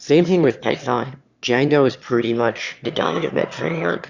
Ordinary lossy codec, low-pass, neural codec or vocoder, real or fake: Opus, 64 kbps; 7.2 kHz; autoencoder, 22.05 kHz, a latent of 192 numbers a frame, VITS, trained on one speaker; fake